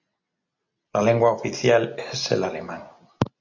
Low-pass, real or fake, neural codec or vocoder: 7.2 kHz; real; none